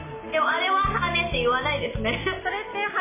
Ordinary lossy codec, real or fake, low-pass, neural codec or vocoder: MP3, 16 kbps; real; 3.6 kHz; none